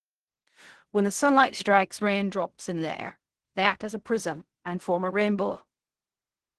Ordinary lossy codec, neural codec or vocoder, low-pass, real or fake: Opus, 16 kbps; codec, 16 kHz in and 24 kHz out, 0.4 kbps, LongCat-Audio-Codec, fine tuned four codebook decoder; 10.8 kHz; fake